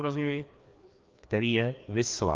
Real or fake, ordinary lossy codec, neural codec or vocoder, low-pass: fake; Opus, 24 kbps; codec, 16 kHz, 2 kbps, FreqCodec, larger model; 7.2 kHz